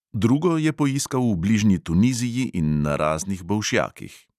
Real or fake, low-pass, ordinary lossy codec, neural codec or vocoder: real; 14.4 kHz; none; none